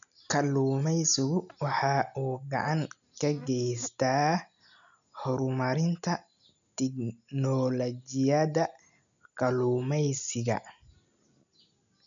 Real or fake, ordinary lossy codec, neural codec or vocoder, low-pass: real; none; none; 7.2 kHz